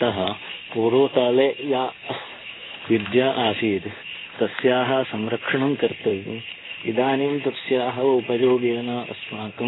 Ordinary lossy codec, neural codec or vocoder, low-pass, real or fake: AAC, 16 kbps; codec, 16 kHz in and 24 kHz out, 1 kbps, XY-Tokenizer; 7.2 kHz; fake